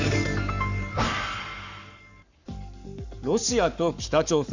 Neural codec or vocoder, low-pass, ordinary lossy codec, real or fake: codec, 44.1 kHz, 7.8 kbps, Pupu-Codec; 7.2 kHz; none; fake